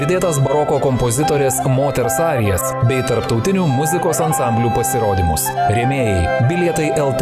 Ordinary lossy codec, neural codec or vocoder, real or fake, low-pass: Opus, 64 kbps; none; real; 14.4 kHz